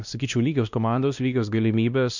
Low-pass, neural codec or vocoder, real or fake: 7.2 kHz; codec, 16 kHz, 1 kbps, X-Codec, WavLM features, trained on Multilingual LibriSpeech; fake